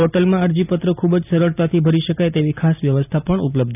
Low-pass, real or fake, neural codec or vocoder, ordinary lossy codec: 3.6 kHz; real; none; none